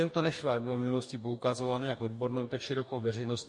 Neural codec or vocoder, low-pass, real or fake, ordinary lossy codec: codec, 44.1 kHz, 2.6 kbps, DAC; 10.8 kHz; fake; MP3, 48 kbps